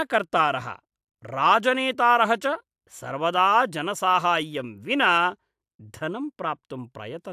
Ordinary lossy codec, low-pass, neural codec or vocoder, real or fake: AAC, 96 kbps; 14.4 kHz; none; real